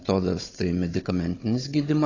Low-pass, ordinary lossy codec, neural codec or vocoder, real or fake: 7.2 kHz; AAC, 32 kbps; codec, 16 kHz, 16 kbps, FunCodec, trained on Chinese and English, 50 frames a second; fake